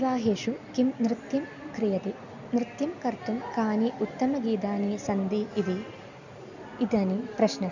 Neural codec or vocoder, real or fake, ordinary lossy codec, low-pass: none; real; none; 7.2 kHz